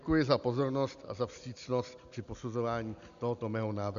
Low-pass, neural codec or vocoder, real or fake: 7.2 kHz; none; real